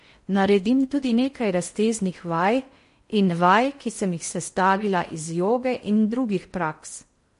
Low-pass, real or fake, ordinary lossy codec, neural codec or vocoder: 10.8 kHz; fake; MP3, 48 kbps; codec, 16 kHz in and 24 kHz out, 0.6 kbps, FocalCodec, streaming, 4096 codes